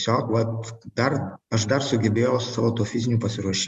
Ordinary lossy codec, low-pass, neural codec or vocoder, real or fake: Opus, 64 kbps; 14.4 kHz; none; real